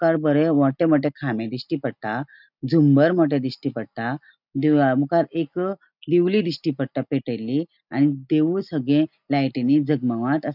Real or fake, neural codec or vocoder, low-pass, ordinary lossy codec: real; none; 5.4 kHz; none